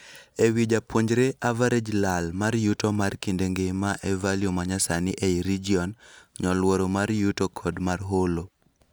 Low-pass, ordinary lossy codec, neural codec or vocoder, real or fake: none; none; none; real